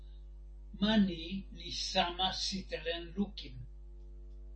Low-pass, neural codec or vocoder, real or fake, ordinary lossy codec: 9.9 kHz; none; real; MP3, 32 kbps